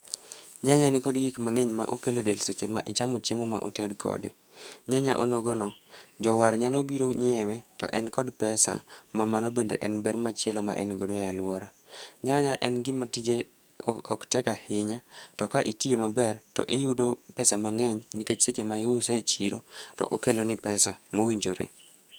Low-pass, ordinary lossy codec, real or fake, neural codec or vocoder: none; none; fake; codec, 44.1 kHz, 2.6 kbps, SNAC